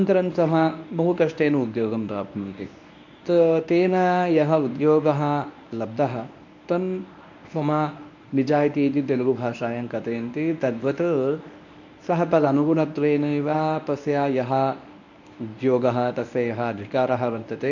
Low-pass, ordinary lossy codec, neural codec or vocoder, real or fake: 7.2 kHz; none; codec, 24 kHz, 0.9 kbps, WavTokenizer, medium speech release version 1; fake